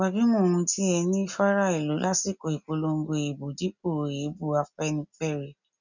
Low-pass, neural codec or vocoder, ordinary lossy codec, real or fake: 7.2 kHz; none; none; real